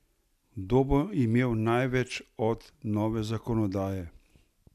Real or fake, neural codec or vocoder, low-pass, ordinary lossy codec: real; none; 14.4 kHz; none